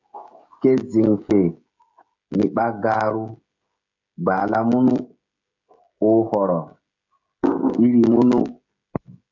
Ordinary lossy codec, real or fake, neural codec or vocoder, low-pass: MP3, 48 kbps; fake; codec, 16 kHz, 16 kbps, FreqCodec, smaller model; 7.2 kHz